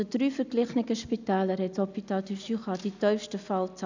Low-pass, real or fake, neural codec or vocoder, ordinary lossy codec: 7.2 kHz; real; none; none